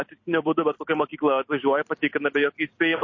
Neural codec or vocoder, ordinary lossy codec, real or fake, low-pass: vocoder, 44.1 kHz, 128 mel bands every 256 samples, BigVGAN v2; MP3, 32 kbps; fake; 7.2 kHz